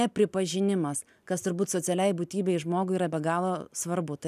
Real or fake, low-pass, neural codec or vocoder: real; 14.4 kHz; none